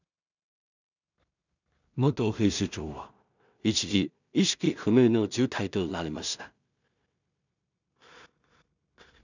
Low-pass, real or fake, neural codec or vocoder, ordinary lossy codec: 7.2 kHz; fake; codec, 16 kHz in and 24 kHz out, 0.4 kbps, LongCat-Audio-Codec, two codebook decoder; none